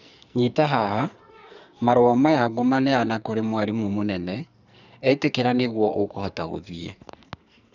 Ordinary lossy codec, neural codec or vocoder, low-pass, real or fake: none; codec, 44.1 kHz, 2.6 kbps, SNAC; 7.2 kHz; fake